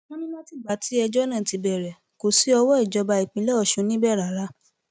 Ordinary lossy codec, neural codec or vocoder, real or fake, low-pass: none; none; real; none